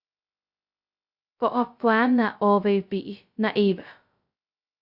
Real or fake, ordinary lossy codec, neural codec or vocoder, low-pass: fake; Opus, 64 kbps; codec, 16 kHz, 0.2 kbps, FocalCodec; 5.4 kHz